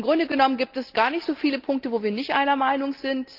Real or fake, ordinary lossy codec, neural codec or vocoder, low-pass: real; Opus, 32 kbps; none; 5.4 kHz